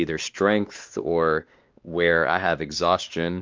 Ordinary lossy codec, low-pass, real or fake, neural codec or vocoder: Opus, 24 kbps; 7.2 kHz; fake; codec, 16 kHz, 2 kbps, X-Codec, WavLM features, trained on Multilingual LibriSpeech